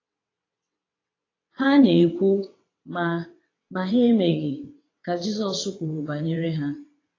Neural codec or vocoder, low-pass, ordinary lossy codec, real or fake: vocoder, 22.05 kHz, 80 mel bands, WaveNeXt; 7.2 kHz; AAC, 32 kbps; fake